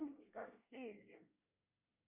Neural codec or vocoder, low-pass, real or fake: codec, 24 kHz, 1 kbps, SNAC; 3.6 kHz; fake